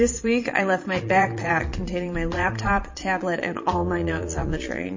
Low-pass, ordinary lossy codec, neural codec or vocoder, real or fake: 7.2 kHz; MP3, 32 kbps; vocoder, 22.05 kHz, 80 mel bands, Vocos; fake